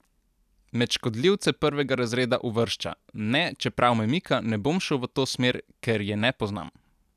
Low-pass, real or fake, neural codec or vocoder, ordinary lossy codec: 14.4 kHz; real; none; none